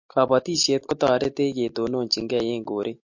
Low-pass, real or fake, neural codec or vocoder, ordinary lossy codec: 7.2 kHz; real; none; MP3, 48 kbps